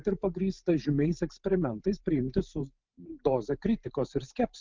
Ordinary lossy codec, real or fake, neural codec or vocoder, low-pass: Opus, 32 kbps; fake; vocoder, 44.1 kHz, 128 mel bands every 512 samples, BigVGAN v2; 7.2 kHz